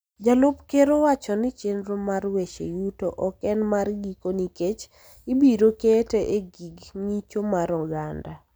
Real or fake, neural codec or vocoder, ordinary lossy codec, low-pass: real; none; none; none